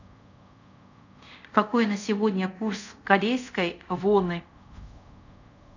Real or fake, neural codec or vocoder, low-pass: fake; codec, 24 kHz, 0.5 kbps, DualCodec; 7.2 kHz